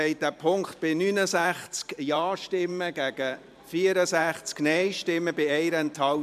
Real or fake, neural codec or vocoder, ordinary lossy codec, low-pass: fake; vocoder, 44.1 kHz, 128 mel bands every 512 samples, BigVGAN v2; MP3, 96 kbps; 14.4 kHz